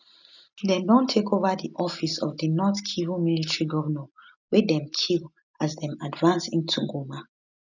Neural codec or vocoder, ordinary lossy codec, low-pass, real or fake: none; none; 7.2 kHz; real